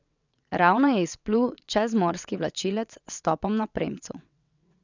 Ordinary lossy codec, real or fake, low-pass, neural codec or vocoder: none; fake; 7.2 kHz; vocoder, 22.05 kHz, 80 mel bands, WaveNeXt